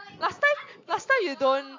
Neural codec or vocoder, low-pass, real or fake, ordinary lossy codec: none; 7.2 kHz; real; AAC, 48 kbps